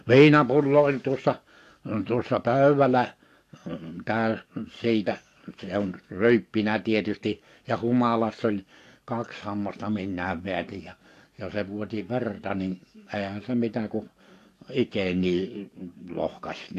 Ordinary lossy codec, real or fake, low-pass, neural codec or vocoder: AAC, 64 kbps; fake; 14.4 kHz; codec, 44.1 kHz, 7.8 kbps, DAC